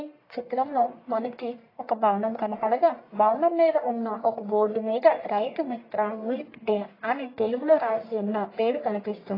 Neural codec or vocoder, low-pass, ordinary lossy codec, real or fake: codec, 44.1 kHz, 1.7 kbps, Pupu-Codec; 5.4 kHz; AAC, 24 kbps; fake